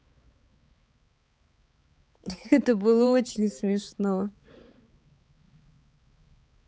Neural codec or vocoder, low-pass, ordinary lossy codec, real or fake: codec, 16 kHz, 4 kbps, X-Codec, HuBERT features, trained on balanced general audio; none; none; fake